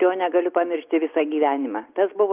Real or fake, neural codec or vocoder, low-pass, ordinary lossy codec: real; none; 3.6 kHz; Opus, 24 kbps